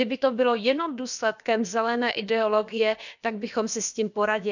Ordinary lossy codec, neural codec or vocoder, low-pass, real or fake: none; codec, 16 kHz, about 1 kbps, DyCAST, with the encoder's durations; 7.2 kHz; fake